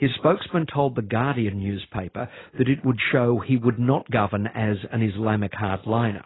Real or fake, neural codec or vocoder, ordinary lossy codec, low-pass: real; none; AAC, 16 kbps; 7.2 kHz